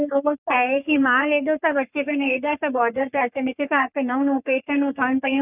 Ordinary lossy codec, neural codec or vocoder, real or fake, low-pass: none; codec, 44.1 kHz, 3.4 kbps, Pupu-Codec; fake; 3.6 kHz